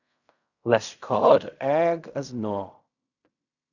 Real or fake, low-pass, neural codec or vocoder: fake; 7.2 kHz; codec, 16 kHz in and 24 kHz out, 0.4 kbps, LongCat-Audio-Codec, fine tuned four codebook decoder